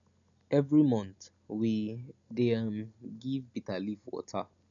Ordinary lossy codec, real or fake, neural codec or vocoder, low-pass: none; real; none; 7.2 kHz